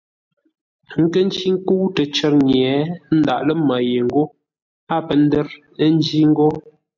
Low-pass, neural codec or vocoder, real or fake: 7.2 kHz; none; real